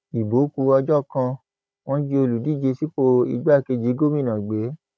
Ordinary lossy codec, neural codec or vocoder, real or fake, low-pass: none; codec, 16 kHz, 16 kbps, FunCodec, trained on Chinese and English, 50 frames a second; fake; none